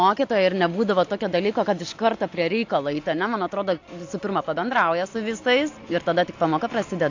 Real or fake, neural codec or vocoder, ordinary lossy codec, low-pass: real; none; AAC, 48 kbps; 7.2 kHz